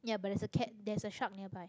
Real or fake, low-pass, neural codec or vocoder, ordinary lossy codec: real; none; none; none